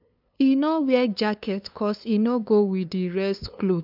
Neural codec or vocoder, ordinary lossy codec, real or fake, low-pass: codec, 16 kHz, 2 kbps, FunCodec, trained on LibriTTS, 25 frames a second; none; fake; 5.4 kHz